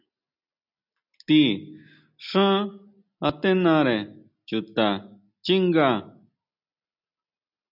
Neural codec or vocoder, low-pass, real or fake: none; 5.4 kHz; real